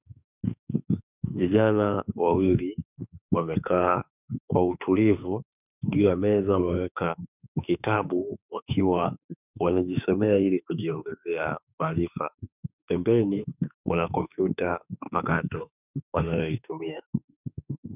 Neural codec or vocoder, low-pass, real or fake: autoencoder, 48 kHz, 32 numbers a frame, DAC-VAE, trained on Japanese speech; 3.6 kHz; fake